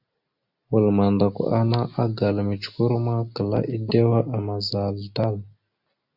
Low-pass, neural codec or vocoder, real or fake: 5.4 kHz; none; real